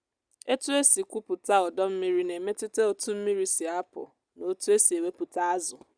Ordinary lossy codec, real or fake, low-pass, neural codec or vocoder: Opus, 64 kbps; real; 9.9 kHz; none